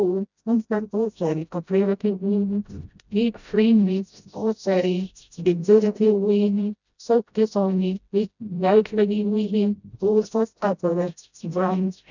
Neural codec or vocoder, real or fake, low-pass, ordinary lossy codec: codec, 16 kHz, 0.5 kbps, FreqCodec, smaller model; fake; 7.2 kHz; none